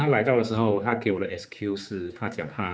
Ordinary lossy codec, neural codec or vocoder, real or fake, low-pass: none; codec, 16 kHz, 4 kbps, X-Codec, HuBERT features, trained on general audio; fake; none